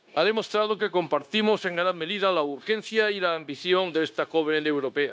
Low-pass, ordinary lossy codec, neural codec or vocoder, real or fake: none; none; codec, 16 kHz, 0.9 kbps, LongCat-Audio-Codec; fake